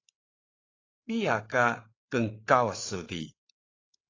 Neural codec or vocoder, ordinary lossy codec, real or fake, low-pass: codec, 16 kHz, 8 kbps, FreqCodec, larger model; AAC, 32 kbps; fake; 7.2 kHz